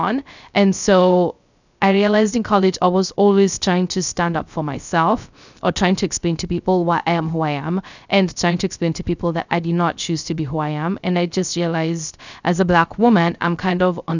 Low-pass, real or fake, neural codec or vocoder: 7.2 kHz; fake; codec, 16 kHz, 0.3 kbps, FocalCodec